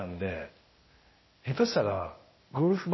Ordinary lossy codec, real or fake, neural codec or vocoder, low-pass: MP3, 24 kbps; fake; codec, 16 kHz, 0.8 kbps, ZipCodec; 7.2 kHz